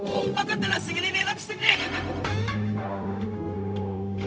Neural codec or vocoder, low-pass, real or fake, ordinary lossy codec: codec, 16 kHz, 0.4 kbps, LongCat-Audio-Codec; none; fake; none